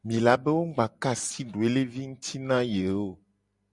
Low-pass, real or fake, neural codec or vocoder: 10.8 kHz; real; none